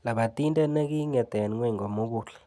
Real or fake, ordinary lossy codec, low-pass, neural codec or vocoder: real; none; none; none